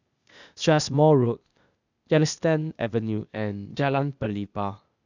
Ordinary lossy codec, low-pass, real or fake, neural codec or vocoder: none; 7.2 kHz; fake; codec, 16 kHz, 0.8 kbps, ZipCodec